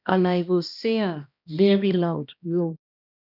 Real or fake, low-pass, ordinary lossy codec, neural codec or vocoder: fake; 5.4 kHz; none; codec, 16 kHz, 1 kbps, X-Codec, HuBERT features, trained on balanced general audio